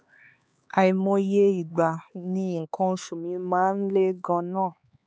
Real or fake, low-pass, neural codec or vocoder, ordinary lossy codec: fake; none; codec, 16 kHz, 4 kbps, X-Codec, HuBERT features, trained on LibriSpeech; none